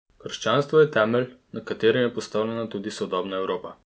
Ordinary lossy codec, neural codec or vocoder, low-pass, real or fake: none; none; none; real